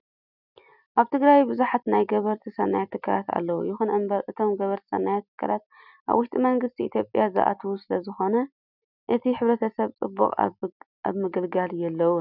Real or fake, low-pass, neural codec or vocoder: real; 5.4 kHz; none